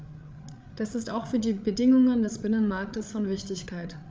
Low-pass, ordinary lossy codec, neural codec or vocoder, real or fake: none; none; codec, 16 kHz, 8 kbps, FreqCodec, larger model; fake